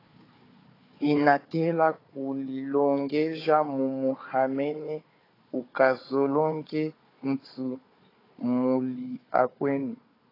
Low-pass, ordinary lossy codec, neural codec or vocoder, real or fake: 5.4 kHz; AAC, 24 kbps; codec, 16 kHz, 4 kbps, FunCodec, trained on Chinese and English, 50 frames a second; fake